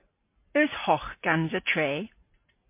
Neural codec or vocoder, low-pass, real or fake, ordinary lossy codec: none; 3.6 kHz; real; MP3, 24 kbps